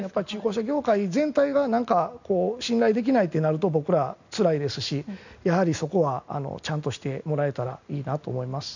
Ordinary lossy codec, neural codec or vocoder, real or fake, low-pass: none; none; real; 7.2 kHz